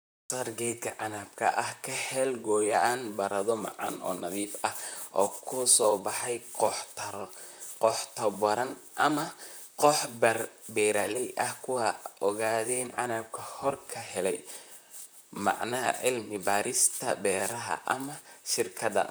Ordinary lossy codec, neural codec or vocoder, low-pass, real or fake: none; vocoder, 44.1 kHz, 128 mel bands, Pupu-Vocoder; none; fake